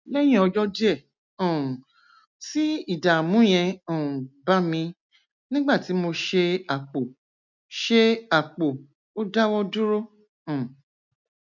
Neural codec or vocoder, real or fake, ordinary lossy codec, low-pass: none; real; none; 7.2 kHz